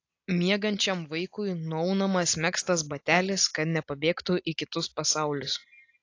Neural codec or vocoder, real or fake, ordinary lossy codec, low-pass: none; real; AAC, 48 kbps; 7.2 kHz